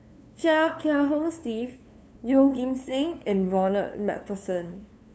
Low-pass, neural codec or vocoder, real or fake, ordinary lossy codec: none; codec, 16 kHz, 2 kbps, FunCodec, trained on LibriTTS, 25 frames a second; fake; none